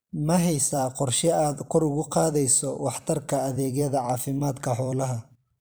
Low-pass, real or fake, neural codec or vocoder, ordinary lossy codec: none; fake; vocoder, 44.1 kHz, 128 mel bands every 256 samples, BigVGAN v2; none